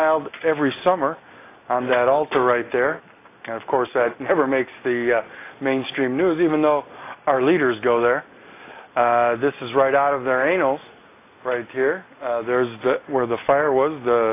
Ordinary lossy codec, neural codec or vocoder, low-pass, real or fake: AAC, 24 kbps; none; 3.6 kHz; real